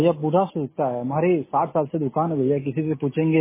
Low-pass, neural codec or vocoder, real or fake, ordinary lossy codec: 3.6 kHz; none; real; MP3, 16 kbps